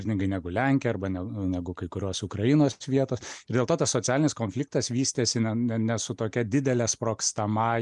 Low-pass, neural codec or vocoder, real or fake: 10.8 kHz; none; real